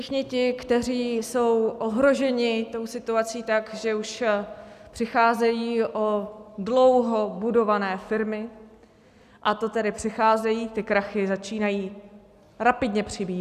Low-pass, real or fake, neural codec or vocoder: 14.4 kHz; real; none